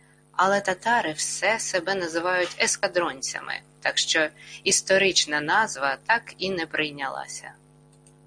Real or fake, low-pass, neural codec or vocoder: real; 9.9 kHz; none